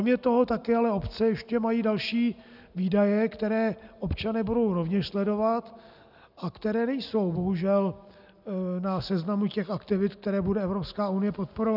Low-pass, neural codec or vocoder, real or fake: 5.4 kHz; none; real